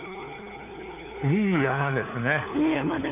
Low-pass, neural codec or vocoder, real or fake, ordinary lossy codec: 3.6 kHz; codec, 16 kHz, 4 kbps, FunCodec, trained on LibriTTS, 50 frames a second; fake; AAC, 32 kbps